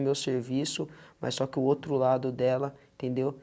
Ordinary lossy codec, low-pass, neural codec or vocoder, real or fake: none; none; none; real